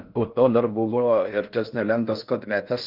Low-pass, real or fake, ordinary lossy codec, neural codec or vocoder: 5.4 kHz; fake; Opus, 32 kbps; codec, 16 kHz in and 24 kHz out, 0.6 kbps, FocalCodec, streaming, 4096 codes